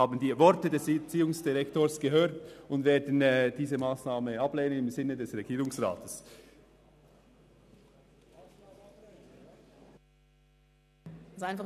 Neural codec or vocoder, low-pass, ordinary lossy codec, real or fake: none; 14.4 kHz; none; real